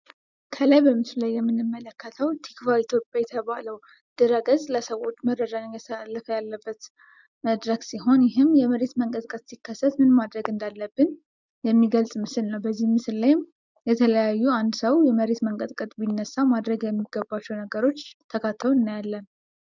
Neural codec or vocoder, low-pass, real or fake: none; 7.2 kHz; real